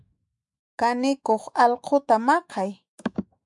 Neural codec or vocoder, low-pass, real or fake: autoencoder, 48 kHz, 128 numbers a frame, DAC-VAE, trained on Japanese speech; 10.8 kHz; fake